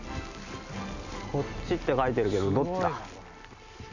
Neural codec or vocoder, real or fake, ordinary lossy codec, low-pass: none; real; none; 7.2 kHz